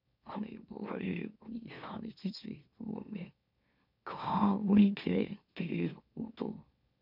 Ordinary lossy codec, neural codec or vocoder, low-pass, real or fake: none; autoencoder, 44.1 kHz, a latent of 192 numbers a frame, MeloTTS; 5.4 kHz; fake